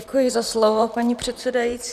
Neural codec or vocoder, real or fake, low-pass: vocoder, 44.1 kHz, 128 mel bands, Pupu-Vocoder; fake; 14.4 kHz